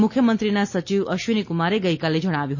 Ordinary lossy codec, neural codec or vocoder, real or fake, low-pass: MP3, 32 kbps; none; real; 7.2 kHz